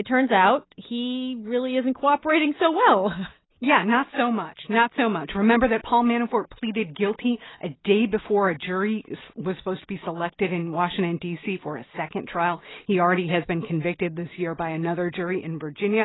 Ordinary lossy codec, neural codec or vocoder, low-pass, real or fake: AAC, 16 kbps; none; 7.2 kHz; real